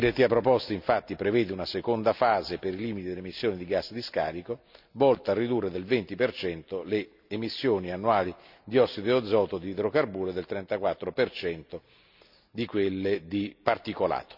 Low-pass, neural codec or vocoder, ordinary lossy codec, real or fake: 5.4 kHz; none; none; real